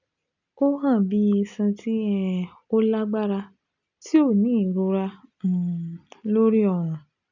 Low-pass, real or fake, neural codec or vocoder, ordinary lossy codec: 7.2 kHz; real; none; none